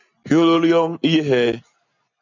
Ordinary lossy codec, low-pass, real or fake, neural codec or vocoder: MP3, 64 kbps; 7.2 kHz; real; none